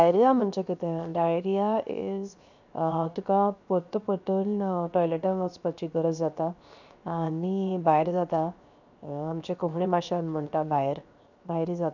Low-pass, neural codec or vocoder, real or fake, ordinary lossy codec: 7.2 kHz; codec, 16 kHz, 0.7 kbps, FocalCodec; fake; none